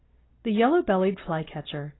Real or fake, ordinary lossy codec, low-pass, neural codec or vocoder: real; AAC, 16 kbps; 7.2 kHz; none